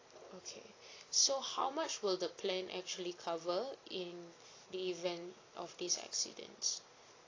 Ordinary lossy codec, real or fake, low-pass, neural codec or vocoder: AAC, 32 kbps; real; 7.2 kHz; none